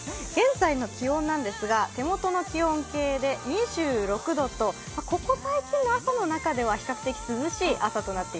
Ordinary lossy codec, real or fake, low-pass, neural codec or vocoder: none; real; none; none